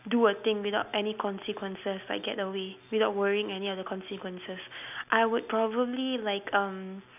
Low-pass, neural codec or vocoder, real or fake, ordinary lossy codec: 3.6 kHz; none; real; none